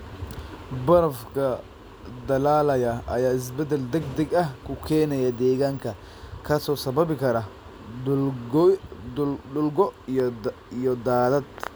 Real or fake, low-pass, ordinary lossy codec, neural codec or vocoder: real; none; none; none